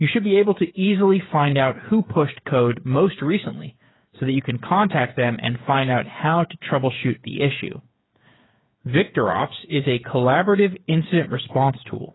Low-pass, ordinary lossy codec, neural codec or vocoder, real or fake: 7.2 kHz; AAC, 16 kbps; codec, 16 kHz, 8 kbps, FreqCodec, smaller model; fake